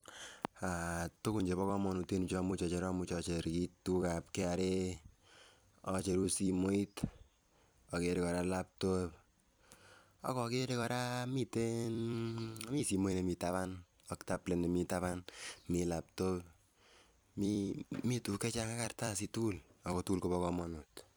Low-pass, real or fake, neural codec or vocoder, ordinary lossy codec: none; fake; vocoder, 44.1 kHz, 128 mel bands every 256 samples, BigVGAN v2; none